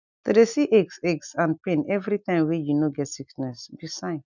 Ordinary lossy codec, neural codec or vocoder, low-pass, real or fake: none; none; 7.2 kHz; real